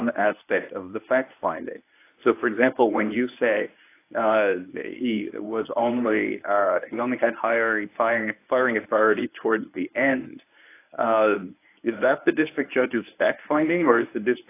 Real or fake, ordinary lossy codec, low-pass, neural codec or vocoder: fake; AAC, 24 kbps; 3.6 kHz; codec, 24 kHz, 0.9 kbps, WavTokenizer, medium speech release version 1